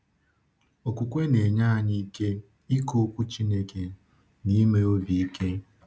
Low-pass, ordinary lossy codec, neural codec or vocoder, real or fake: none; none; none; real